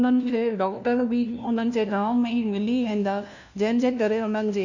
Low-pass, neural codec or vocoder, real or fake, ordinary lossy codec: 7.2 kHz; codec, 16 kHz, 1 kbps, FunCodec, trained on LibriTTS, 50 frames a second; fake; none